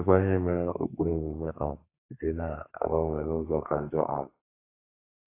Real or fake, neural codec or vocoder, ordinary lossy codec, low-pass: fake; codec, 32 kHz, 1.9 kbps, SNAC; AAC, 24 kbps; 3.6 kHz